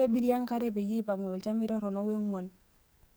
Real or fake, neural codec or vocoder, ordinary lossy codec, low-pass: fake; codec, 44.1 kHz, 2.6 kbps, SNAC; none; none